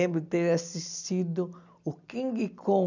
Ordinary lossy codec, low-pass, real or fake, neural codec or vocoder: none; 7.2 kHz; real; none